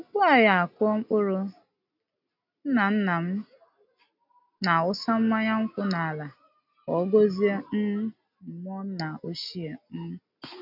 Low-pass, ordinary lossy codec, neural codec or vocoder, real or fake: 5.4 kHz; none; none; real